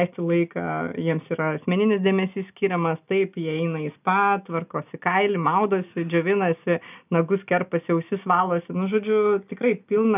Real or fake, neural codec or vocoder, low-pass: real; none; 3.6 kHz